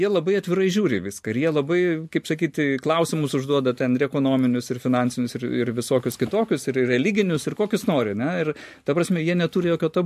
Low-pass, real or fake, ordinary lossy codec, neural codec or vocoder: 14.4 kHz; real; MP3, 64 kbps; none